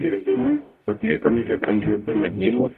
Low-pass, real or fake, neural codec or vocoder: 14.4 kHz; fake; codec, 44.1 kHz, 0.9 kbps, DAC